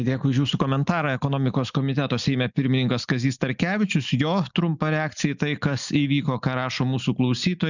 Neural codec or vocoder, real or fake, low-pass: none; real; 7.2 kHz